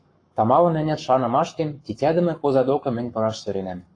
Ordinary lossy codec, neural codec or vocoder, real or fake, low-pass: AAC, 48 kbps; codec, 44.1 kHz, 7.8 kbps, Pupu-Codec; fake; 9.9 kHz